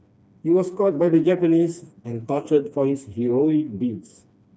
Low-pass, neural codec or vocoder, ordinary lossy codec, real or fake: none; codec, 16 kHz, 2 kbps, FreqCodec, smaller model; none; fake